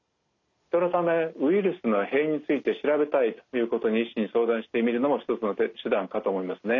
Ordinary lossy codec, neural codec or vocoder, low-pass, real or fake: none; none; 7.2 kHz; real